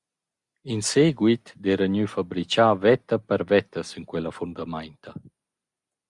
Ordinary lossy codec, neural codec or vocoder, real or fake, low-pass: Opus, 64 kbps; none; real; 10.8 kHz